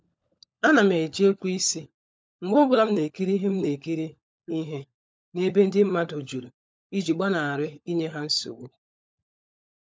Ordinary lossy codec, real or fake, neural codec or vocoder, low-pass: none; fake; codec, 16 kHz, 16 kbps, FunCodec, trained on LibriTTS, 50 frames a second; none